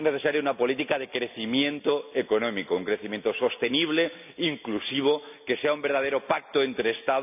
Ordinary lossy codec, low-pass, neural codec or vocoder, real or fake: none; 3.6 kHz; none; real